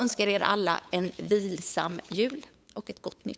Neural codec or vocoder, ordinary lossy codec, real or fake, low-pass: codec, 16 kHz, 8 kbps, FunCodec, trained on LibriTTS, 25 frames a second; none; fake; none